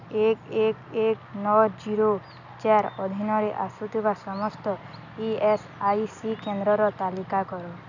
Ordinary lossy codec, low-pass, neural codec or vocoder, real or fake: none; 7.2 kHz; none; real